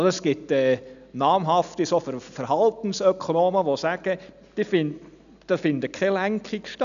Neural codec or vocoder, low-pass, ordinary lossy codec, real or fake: none; 7.2 kHz; none; real